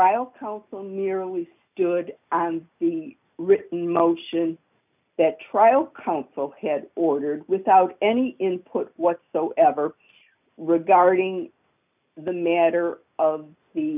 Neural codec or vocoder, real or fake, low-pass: none; real; 3.6 kHz